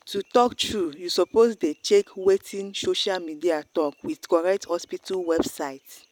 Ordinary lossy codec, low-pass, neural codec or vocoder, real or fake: none; 19.8 kHz; vocoder, 44.1 kHz, 128 mel bands every 256 samples, BigVGAN v2; fake